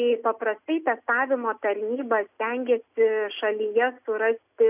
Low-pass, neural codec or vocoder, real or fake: 3.6 kHz; none; real